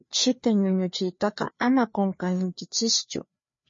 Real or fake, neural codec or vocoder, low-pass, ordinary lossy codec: fake; codec, 16 kHz, 2 kbps, FreqCodec, larger model; 7.2 kHz; MP3, 32 kbps